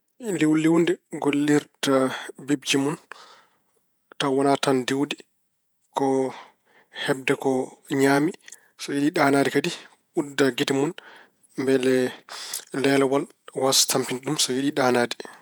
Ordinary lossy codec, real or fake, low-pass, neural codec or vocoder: none; fake; none; vocoder, 48 kHz, 128 mel bands, Vocos